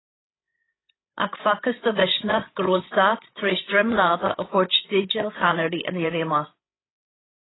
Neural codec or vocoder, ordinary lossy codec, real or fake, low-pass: codec, 16 kHz, 16 kbps, FreqCodec, larger model; AAC, 16 kbps; fake; 7.2 kHz